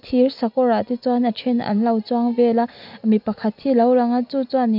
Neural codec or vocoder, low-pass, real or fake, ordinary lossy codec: none; 5.4 kHz; real; none